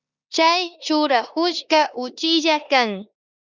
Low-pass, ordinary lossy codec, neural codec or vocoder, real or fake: 7.2 kHz; Opus, 64 kbps; codec, 16 kHz in and 24 kHz out, 0.9 kbps, LongCat-Audio-Codec, four codebook decoder; fake